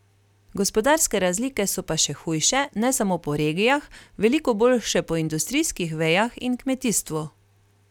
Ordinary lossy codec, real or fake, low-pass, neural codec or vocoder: none; real; 19.8 kHz; none